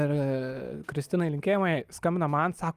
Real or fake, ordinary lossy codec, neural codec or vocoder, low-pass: real; Opus, 32 kbps; none; 14.4 kHz